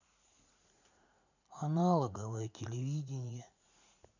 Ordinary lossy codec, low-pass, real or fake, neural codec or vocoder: none; 7.2 kHz; fake; vocoder, 44.1 kHz, 80 mel bands, Vocos